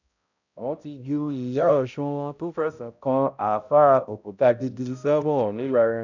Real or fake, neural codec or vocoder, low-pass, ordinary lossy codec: fake; codec, 16 kHz, 0.5 kbps, X-Codec, HuBERT features, trained on balanced general audio; 7.2 kHz; none